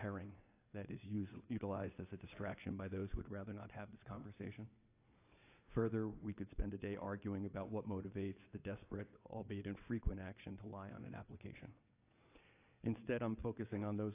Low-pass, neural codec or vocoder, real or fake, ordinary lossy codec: 3.6 kHz; vocoder, 22.05 kHz, 80 mel bands, WaveNeXt; fake; AAC, 24 kbps